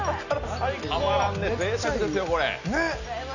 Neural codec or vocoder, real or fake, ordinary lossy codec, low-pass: none; real; none; 7.2 kHz